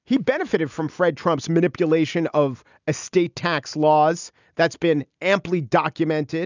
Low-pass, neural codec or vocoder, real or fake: 7.2 kHz; none; real